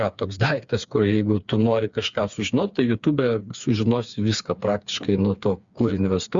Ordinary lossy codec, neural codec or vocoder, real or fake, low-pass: Opus, 64 kbps; codec, 16 kHz, 4 kbps, FreqCodec, smaller model; fake; 7.2 kHz